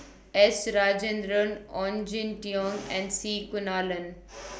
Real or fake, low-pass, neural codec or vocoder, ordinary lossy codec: real; none; none; none